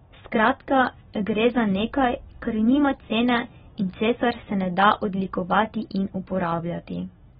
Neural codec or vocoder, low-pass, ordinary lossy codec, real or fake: none; 19.8 kHz; AAC, 16 kbps; real